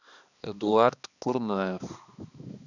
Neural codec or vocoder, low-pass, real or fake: codec, 16 kHz, 2 kbps, X-Codec, HuBERT features, trained on balanced general audio; 7.2 kHz; fake